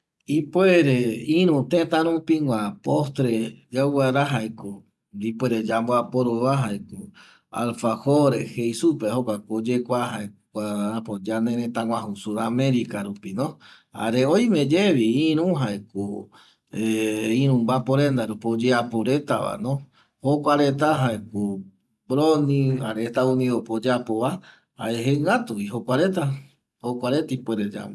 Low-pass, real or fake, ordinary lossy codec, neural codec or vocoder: none; real; none; none